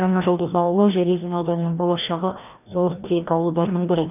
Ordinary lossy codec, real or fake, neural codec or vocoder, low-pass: none; fake; codec, 16 kHz, 1 kbps, FreqCodec, larger model; 3.6 kHz